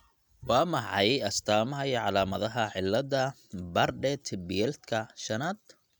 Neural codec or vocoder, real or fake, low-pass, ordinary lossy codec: none; real; 19.8 kHz; none